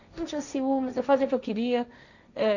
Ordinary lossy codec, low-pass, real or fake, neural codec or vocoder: none; 7.2 kHz; fake; codec, 16 kHz, 1.1 kbps, Voila-Tokenizer